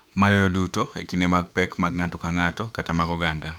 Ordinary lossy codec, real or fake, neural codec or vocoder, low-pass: none; fake; autoencoder, 48 kHz, 32 numbers a frame, DAC-VAE, trained on Japanese speech; 19.8 kHz